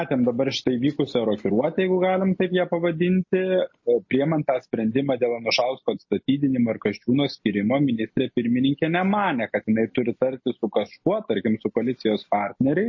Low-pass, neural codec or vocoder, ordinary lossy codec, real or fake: 7.2 kHz; none; MP3, 32 kbps; real